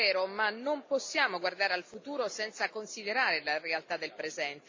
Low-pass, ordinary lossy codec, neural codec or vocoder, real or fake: 7.2 kHz; MP3, 64 kbps; none; real